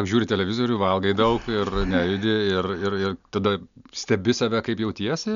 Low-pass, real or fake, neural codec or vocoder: 7.2 kHz; real; none